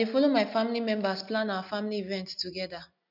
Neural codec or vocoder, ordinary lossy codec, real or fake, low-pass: none; none; real; 5.4 kHz